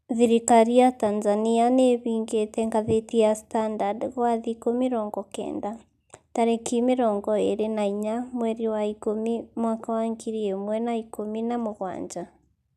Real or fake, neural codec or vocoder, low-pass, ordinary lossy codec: real; none; 14.4 kHz; none